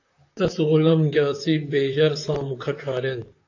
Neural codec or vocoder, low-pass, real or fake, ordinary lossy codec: vocoder, 44.1 kHz, 128 mel bands, Pupu-Vocoder; 7.2 kHz; fake; AAC, 48 kbps